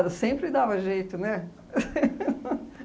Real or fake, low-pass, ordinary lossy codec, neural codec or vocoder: real; none; none; none